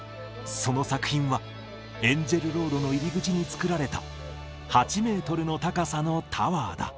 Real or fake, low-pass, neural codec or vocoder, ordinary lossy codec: real; none; none; none